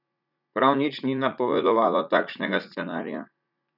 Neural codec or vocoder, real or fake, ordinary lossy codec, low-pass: vocoder, 44.1 kHz, 80 mel bands, Vocos; fake; none; 5.4 kHz